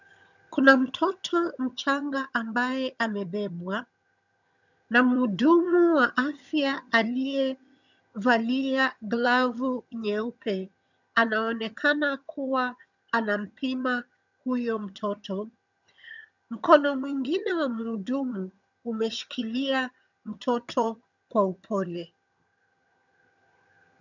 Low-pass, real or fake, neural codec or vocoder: 7.2 kHz; fake; vocoder, 22.05 kHz, 80 mel bands, HiFi-GAN